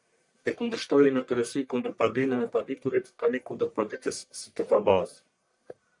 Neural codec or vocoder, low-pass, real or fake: codec, 44.1 kHz, 1.7 kbps, Pupu-Codec; 10.8 kHz; fake